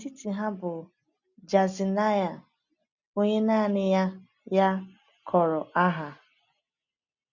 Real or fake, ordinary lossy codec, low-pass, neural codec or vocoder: real; none; 7.2 kHz; none